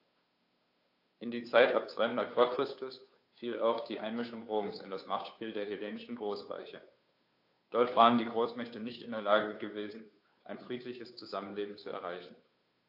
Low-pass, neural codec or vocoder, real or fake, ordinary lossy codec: 5.4 kHz; codec, 16 kHz, 2 kbps, FunCodec, trained on Chinese and English, 25 frames a second; fake; AAC, 32 kbps